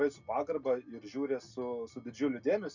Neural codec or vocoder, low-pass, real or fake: none; 7.2 kHz; real